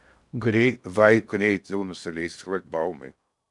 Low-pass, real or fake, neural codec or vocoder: 10.8 kHz; fake; codec, 16 kHz in and 24 kHz out, 0.6 kbps, FocalCodec, streaming, 2048 codes